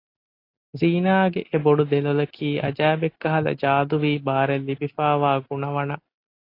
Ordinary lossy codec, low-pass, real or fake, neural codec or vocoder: AAC, 32 kbps; 5.4 kHz; real; none